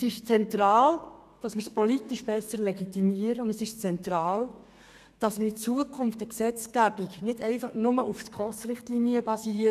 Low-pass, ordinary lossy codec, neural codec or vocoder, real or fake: 14.4 kHz; none; codec, 32 kHz, 1.9 kbps, SNAC; fake